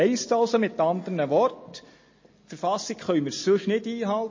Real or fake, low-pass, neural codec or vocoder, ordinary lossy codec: real; 7.2 kHz; none; MP3, 32 kbps